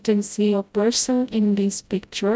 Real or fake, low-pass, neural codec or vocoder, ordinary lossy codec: fake; none; codec, 16 kHz, 0.5 kbps, FreqCodec, smaller model; none